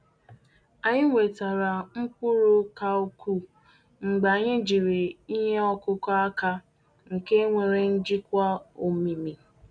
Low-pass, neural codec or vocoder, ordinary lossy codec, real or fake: 9.9 kHz; none; none; real